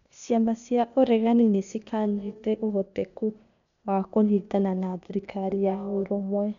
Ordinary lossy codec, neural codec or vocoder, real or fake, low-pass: none; codec, 16 kHz, 0.8 kbps, ZipCodec; fake; 7.2 kHz